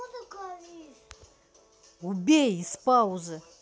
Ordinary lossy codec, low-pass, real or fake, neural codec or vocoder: none; none; real; none